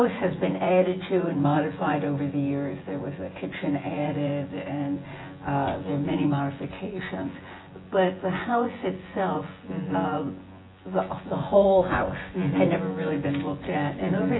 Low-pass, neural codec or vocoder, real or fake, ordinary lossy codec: 7.2 kHz; vocoder, 24 kHz, 100 mel bands, Vocos; fake; AAC, 16 kbps